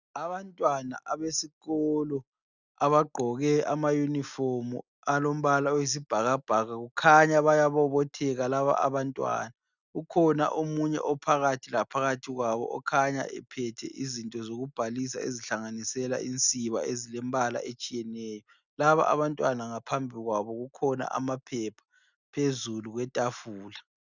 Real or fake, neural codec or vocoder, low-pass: real; none; 7.2 kHz